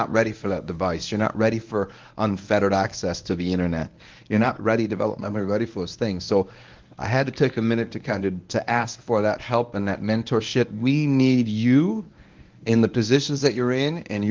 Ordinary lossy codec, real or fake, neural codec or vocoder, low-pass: Opus, 32 kbps; fake; codec, 24 kHz, 0.9 kbps, WavTokenizer, medium speech release version 1; 7.2 kHz